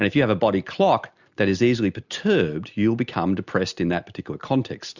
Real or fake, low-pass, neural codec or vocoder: real; 7.2 kHz; none